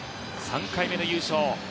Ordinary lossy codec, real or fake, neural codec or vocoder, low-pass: none; real; none; none